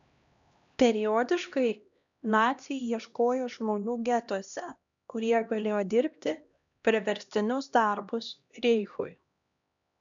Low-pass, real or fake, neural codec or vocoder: 7.2 kHz; fake; codec, 16 kHz, 1 kbps, X-Codec, HuBERT features, trained on LibriSpeech